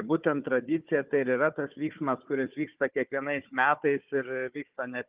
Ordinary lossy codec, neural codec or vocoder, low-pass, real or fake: Opus, 24 kbps; codec, 16 kHz, 4 kbps, FunCodec, trained on Chinese and English, 50 frames a second; 3.6 kHz; fake